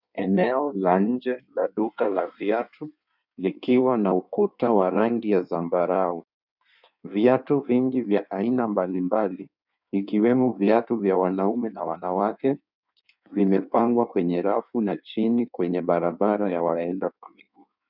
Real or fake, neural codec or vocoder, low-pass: fake; codec, 16 kHz in and 24 kHz out, 1.1 kbps, FireRedTTS-2 codec; 5.4 kHz